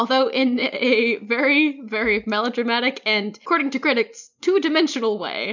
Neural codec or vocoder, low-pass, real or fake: none; 7.2 kHz; real